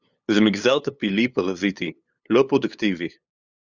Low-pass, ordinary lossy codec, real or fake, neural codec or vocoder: 7.2 kHz; Opus, 64 kbps; fake; codec, 16 kHz, 8 kbps, FunCodec, trained on LibriTTS, 25 frames a second